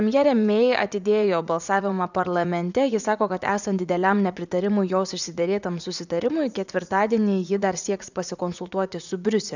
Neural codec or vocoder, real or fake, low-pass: vocoder, 44.1 kHz, 80 mel bands, Vocos; fake; 7.2 kHz